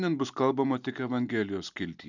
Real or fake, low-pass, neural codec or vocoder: real; 7.2 kHz; none